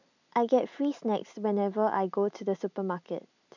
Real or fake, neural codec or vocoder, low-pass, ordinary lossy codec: real; none; 7.2 kHz; none